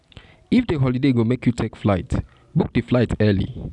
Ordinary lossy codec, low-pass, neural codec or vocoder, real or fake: none; 10.8 kHz; none; real